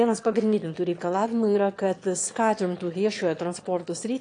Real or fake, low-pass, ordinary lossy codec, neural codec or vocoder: fake; 9.9 kHz; AAC, 48 kbps; autoencoder, 22.05 kHz, a latent of 192 numbers a frame, VITS, trained on one speaker